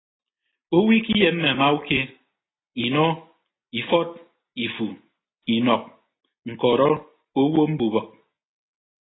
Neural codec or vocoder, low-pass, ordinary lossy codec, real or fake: none; 7.2 kHz; AAC, 16 kbps; real